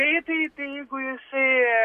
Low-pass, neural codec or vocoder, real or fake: 14.4 kHz; none; real